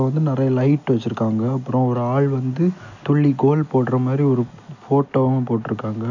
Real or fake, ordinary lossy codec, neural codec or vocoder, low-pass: real; none; none; 7.2 kHz